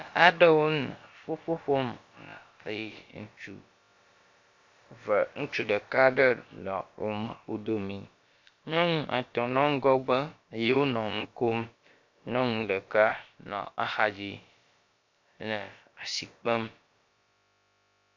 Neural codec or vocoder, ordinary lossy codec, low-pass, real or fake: codec, 16 kHz, about 1 kbps, DyCAST, with the encoder's durations; MP3, 48 kbps; 7.2 kHz; fake